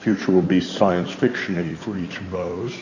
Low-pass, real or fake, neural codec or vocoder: 7.2 kHz; fake; codec, 16 kHz in and 24 kHz out, 2.2 kbps, FireRedTTS-2 codec